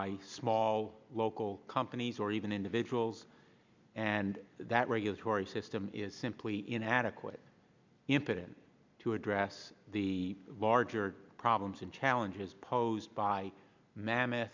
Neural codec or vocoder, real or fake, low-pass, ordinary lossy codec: none; real; 7.2 kHz; MP3, 64 kbps